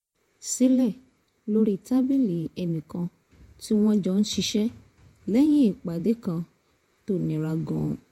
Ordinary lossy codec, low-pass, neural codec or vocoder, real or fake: MP3, 64 kbps; 19.8 kHz; vocoder, 48 kHz, 128 mel bands, Vocos; fake